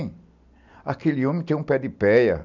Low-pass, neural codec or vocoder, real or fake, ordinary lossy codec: 7.2 kHz; none; real; none